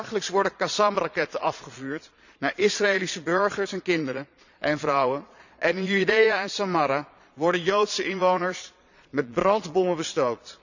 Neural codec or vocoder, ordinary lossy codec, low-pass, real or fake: vocoder, 22.05 kHz, 80 mel bands, Vocos; none; 7.2 kHz; fake